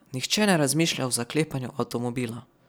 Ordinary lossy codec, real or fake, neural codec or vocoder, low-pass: none; real; none; none